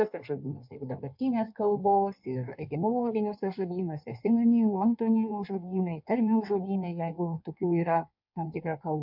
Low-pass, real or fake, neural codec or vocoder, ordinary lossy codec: 5.4 kHz; fake; codec, 16 kHz in and 24 kHz out, 1.1 kbps, FireRedTTS-2 codec; MP3, 48 kbps